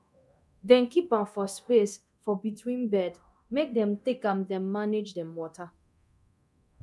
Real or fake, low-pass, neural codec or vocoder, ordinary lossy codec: fake; none; codec, 24 kHz, 0.9 kbps, DualCodec; none